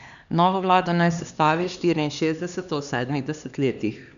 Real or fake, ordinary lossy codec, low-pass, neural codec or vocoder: fake; none; 7.2 kHz; codec, 16 kHz, 2 kbps, X-Codec, HuBERT features, trained on LibriSpeech